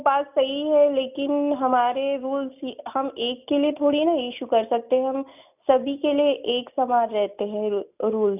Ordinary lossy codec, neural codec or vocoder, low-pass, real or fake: none; none; 3.6 kHz; real